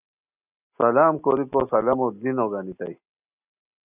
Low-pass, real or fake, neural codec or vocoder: 3.6 kHz; real; none